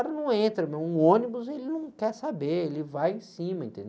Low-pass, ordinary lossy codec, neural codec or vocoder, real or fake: none; none; none; real